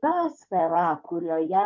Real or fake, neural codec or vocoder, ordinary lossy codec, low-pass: fake; codec, 16 kHz in and 24 kHz out, 2.2 kbps, FireRedTTS-2 codec; MP3, 64 kbps; 7.2 kHz